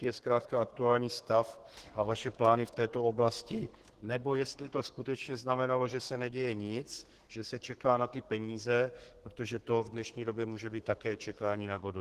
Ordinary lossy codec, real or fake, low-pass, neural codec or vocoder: Opus, 16 kbps; fake; 14.4 kHz; codec, 32 kHz, 1.9 kbps, SNAC